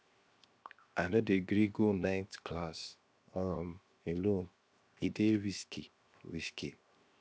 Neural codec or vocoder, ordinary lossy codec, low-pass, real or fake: codec, 16 kHz, 0.7 kbps, FocalCodec; none; none; fake